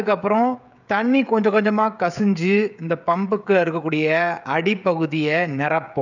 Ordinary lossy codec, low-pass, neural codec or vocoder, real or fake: none; 7.2 kHz; codec, 16 kHz, 16 kbps, FreqCodec, smaller model; fake